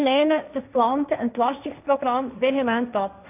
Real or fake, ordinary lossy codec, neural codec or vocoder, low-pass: fake; none; codec, 16 kHz, 1.1 kbps, Voila-Tokenizer; 3.6 kHz